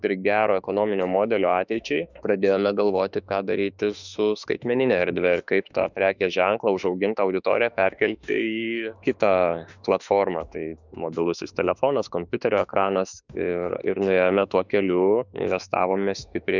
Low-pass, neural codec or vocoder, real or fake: 7.2 kHz; autoencoder, 48 kHz, 32 numbers a frame, DAC-VAE, trained on Japanese speech; fake